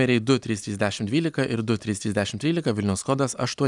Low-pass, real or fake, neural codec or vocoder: 10.8 kHz; real; none